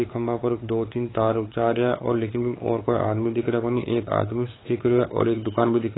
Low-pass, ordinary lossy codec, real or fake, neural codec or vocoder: 7.2 kHz; AAC, 16 kbps; fake; codec, 16 kHz, 8 kbps, FunCodec, trained on LibriTTS, 25 frames a second